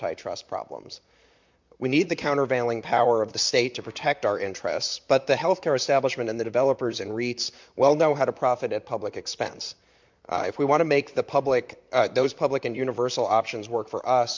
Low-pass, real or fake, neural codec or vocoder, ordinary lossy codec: 7.2 kHz; fake; vocoder, 44.1 kHz, 128 mel bands, Pupu-Vocoder; MP3, 64 kbps